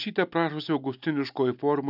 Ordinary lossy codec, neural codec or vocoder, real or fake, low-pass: AAC, 48 kbps; none; real; 5.4 kHz